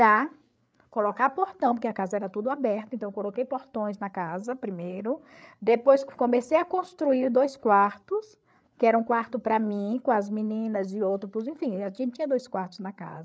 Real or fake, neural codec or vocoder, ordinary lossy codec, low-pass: fake; codec, 16 kHz, 8 kbps, FreqCodec, larger model; none; none